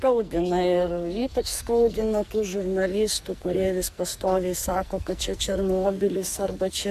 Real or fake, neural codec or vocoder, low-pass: fake; codec, 44.1 kHz, 2.6 kbps, SNAC; 14.4 kHz